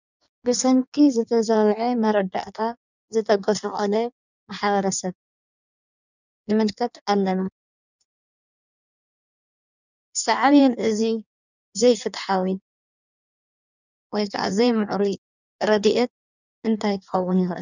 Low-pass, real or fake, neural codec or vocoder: 7.2 kHz; fake; codec, 16 kHz in and 24 kHz out, 1.1 kbps, FireRedTTS-2 codec